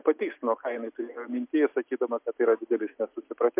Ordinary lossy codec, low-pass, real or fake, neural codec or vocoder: MP3, 32 kbps; 3.6 kHz; real; none